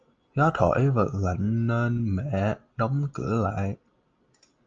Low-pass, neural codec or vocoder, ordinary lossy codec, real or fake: 7.2 kHz; none; Opus, 24 kbps; real